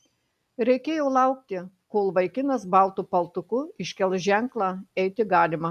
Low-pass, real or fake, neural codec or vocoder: 14.4 kHz; real; none